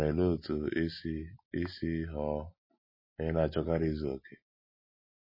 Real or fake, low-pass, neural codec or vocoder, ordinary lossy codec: real; 5.4 kHz; none; MP3, 24 kbps